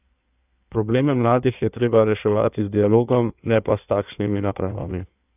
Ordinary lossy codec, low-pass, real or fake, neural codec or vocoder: none; 3.6 kHz; fake; codec, 16 kHz in and 24 kHz out, 1.1 kbps, FireRedTTS-2 codec